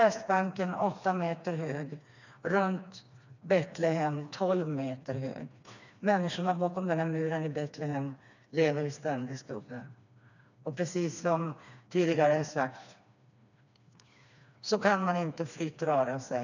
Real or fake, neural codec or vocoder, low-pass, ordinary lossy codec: fake; codec, 16 kHz, 2 kbps, FreqCodec, smaller model; 7.2 kHz; none